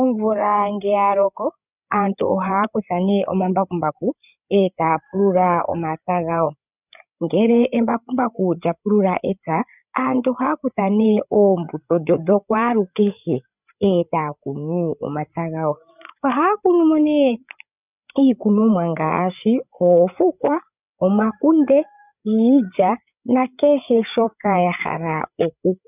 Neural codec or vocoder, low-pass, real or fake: codec, 16 kHz, 8 kbps, FreqCodec, larger model; 3.6 kHz; fake